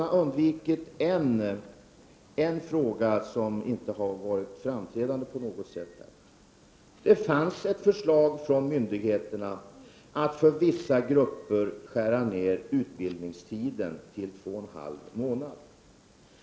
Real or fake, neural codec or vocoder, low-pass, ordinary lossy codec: real; none; none; none